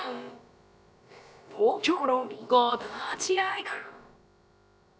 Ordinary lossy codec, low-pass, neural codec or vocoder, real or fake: none; none; codec, 16 kHz, about 1 kbps, DyCAST, with the encoder's durations; fake